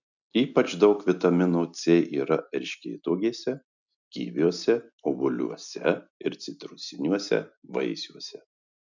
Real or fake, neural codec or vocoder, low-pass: real; none; 7.2 kHz